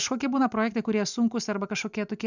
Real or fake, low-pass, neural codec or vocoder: real; 7.2 kHz; none